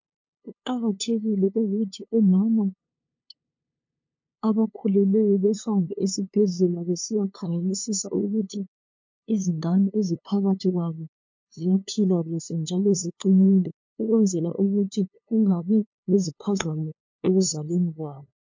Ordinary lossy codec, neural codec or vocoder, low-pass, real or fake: MP3, 48 kbps; codec, 16 kHz, 2 kbps, FunCodec, trained on LibriTTS, 25 frames a second; 7.2 kHz; fake